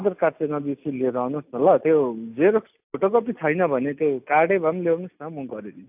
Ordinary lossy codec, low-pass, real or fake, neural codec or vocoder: AAC, 32 kbps; 3.6 kHz; real; none